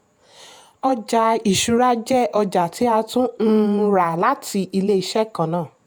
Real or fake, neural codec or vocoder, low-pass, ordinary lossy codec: fake; vocoder, 48 kHz, 128 mel bands, Vocos; none; none